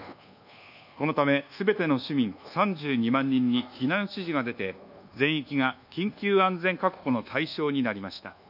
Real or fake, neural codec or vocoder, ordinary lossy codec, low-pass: fake; codec, 24 kHz, 1.2 kbps, DualCodec; none; 5.4 kHz